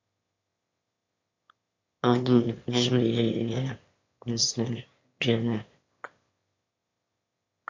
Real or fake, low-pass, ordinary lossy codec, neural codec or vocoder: fake; 7.2 kHz; MP3, 48 kbps; autoencoder, 22.05 kHz, a latent of 192 numbers a frame, VITS, trained on one speaker